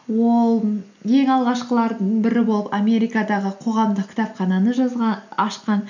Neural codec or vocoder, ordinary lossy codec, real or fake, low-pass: none; none; real; 7.2 kHz